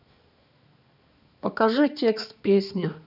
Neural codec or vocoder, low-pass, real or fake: codec, 16 kHz, 2 kbps, X-Codec, HuBERT features, trained on balanced general audio; 5.4 kHz; fake